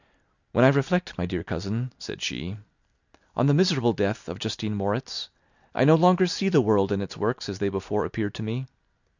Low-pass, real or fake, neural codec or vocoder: 7.2 kHz; real; none